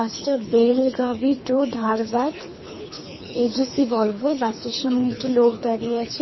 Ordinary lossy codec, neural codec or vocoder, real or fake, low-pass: MP3, 24 kbps; codec, 24 kHz, 3 kbps, HILCodec; fake; 7.2 kHz